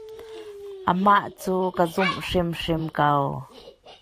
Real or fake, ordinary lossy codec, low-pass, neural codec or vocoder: fake; AAC, 64 kbps; 14.4 kHz; vocoder, 44.1 kHz, 128 mel bands every 512 samples, BigVGAN v2